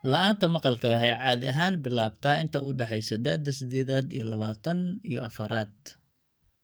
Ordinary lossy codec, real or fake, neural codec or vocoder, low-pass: none; fake; codec, 44.1 kHz, 2.6 kbps, SNAC; none